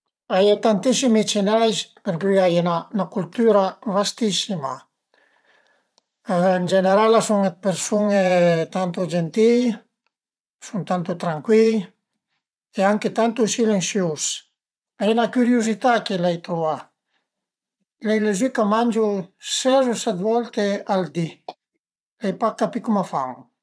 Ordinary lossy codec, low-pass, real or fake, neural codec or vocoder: none; none; fake; vocoder, 22.05 kHz, 80 mel bands, Vocos